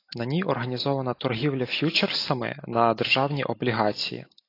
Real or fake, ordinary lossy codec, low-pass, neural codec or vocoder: real; AAC, 32 kbps; 5.4 kHz; none